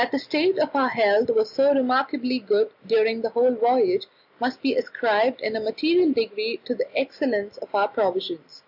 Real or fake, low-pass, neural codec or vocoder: real; 5.4 kHz; none